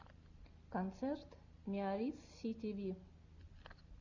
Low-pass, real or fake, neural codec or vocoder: 7.2 kHz; real; none